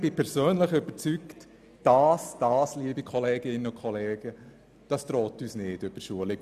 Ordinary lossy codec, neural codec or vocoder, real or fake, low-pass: none; none; real; 14.4 kHz